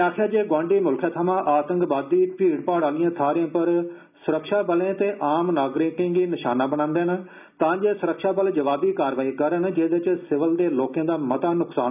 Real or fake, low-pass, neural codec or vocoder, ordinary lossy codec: real; 3.6 kHz; none; none